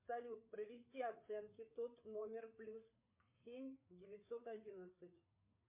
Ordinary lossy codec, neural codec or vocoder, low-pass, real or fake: MP3, 24 kbps; codec, 16 kHz, 4 kbps, FreqCodec, larger model; 3.6 kHz; fake